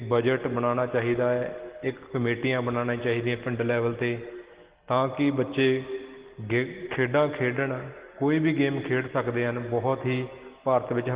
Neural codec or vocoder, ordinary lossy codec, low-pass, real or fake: none; Opus, 16 kbps; 3.6 kHz; real